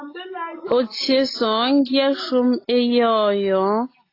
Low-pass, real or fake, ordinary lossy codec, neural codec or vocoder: 5.4 kHz; real; AAC, 24 kbps; none